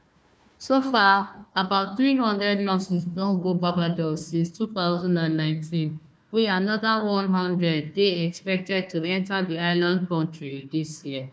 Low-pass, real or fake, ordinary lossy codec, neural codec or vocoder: none; fake; none; codec, 16 kHz, 1 kbps, FunCodec, trained on Chinese and English, 50 frames a second